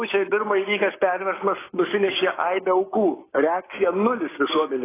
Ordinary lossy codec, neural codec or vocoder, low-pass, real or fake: AAC, 16 kbps; codec, 16 kHz, 6 kbps, DAC; 3.6 kHz; fake